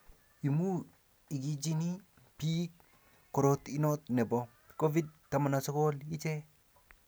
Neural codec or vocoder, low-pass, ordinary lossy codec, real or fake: none; none; none; real